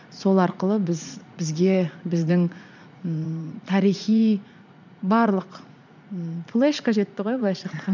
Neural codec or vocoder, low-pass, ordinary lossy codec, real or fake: vocoder, 44.1 kHz, 80 mel bands, Vocos; 7.2 kHz; none; fake